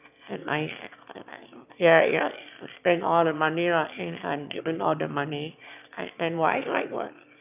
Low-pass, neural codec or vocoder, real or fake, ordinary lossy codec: 3.6 kHz; autoencoder, 22.05 kHz, a latent of 192 numbers a frame, VITS, trained on one speaker; fake; none